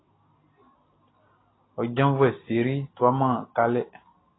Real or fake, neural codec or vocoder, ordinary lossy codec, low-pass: real; none; AAC, 16 kbps; 7.2 kHz